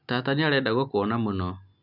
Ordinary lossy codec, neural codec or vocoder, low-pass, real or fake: none; none; 5.4 kHz; real